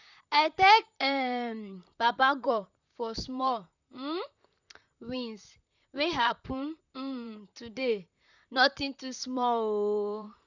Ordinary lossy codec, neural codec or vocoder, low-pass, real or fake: none; vocoder, 44.1 kHz, 128 mel bands, Pupu-Vocoder; 7.2 kHz; fake